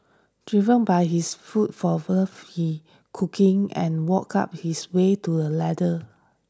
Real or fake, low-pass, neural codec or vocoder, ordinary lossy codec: real; none; none; none